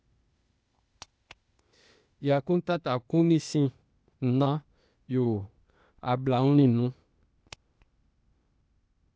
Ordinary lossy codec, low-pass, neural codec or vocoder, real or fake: none; none; codec, 16 kHz, 0.8 kbps, ZipCodec; fake